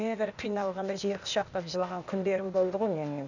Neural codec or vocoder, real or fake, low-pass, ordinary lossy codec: codec, 16 kHz, 0.8 kbps, ZipCodec; fake; 7.2 kHz; none